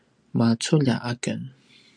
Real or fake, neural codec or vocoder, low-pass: real; none; 9.9 kHz